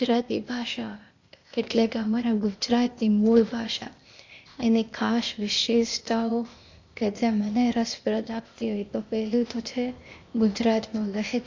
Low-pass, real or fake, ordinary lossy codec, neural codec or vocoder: 7.2 kHz; fake; AAC, 48 kbps; codec, 16 kHz, 0.8 kbps, ZipCodec